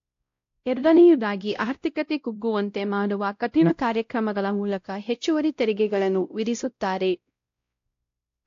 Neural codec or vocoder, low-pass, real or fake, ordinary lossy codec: codec, 16 kHz, 0.5 kbps, X-Codec, WavLM features, trained on Multilingual LibriSpeech; 7.2 kHz; fake; AAC, 48 kbps